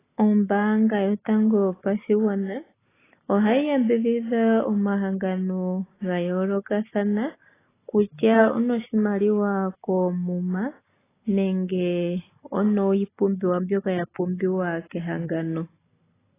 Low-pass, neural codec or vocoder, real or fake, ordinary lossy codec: 3.6 kHz; none; real; AAC, 16 kbps